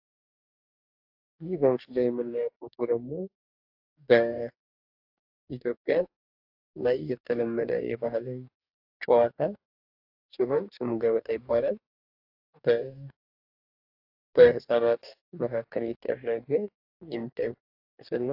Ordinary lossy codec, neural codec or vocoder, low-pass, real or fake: AAC, 48 kbps; codec, 44.1 kHz, 2.6 kbps, DAC; 5.4 kHz; fake